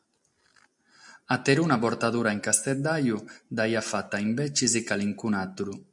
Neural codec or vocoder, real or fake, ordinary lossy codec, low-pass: none; real; MP3, 96 kbps; 10.8 kHz